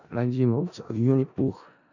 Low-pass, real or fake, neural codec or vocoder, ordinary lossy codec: 7.2 kHz; fake; codec, 16 kHz in and 24 kHz out, 0.4 kbps, LongCat-Audio-Codec, four codebook decoder; none